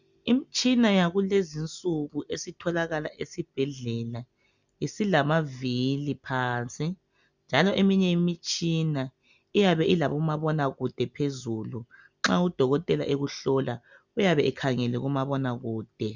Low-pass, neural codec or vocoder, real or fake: 7.2 kHz; none; real